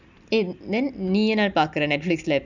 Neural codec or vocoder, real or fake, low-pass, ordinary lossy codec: none; real; 7.2 kHz; none